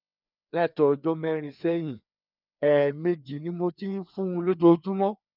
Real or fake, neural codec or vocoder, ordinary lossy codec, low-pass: fake; codec, 16 kHz, 2 kbps, FreqCodec, larger model; none; 5.4 kHz